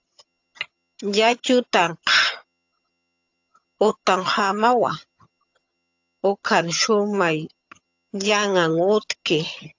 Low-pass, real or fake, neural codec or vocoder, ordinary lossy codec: 7.2 kHz; fake; vocoder, 22.05 kHz, 80 mel bands, HiFi-GAN; AAC, 48 kbps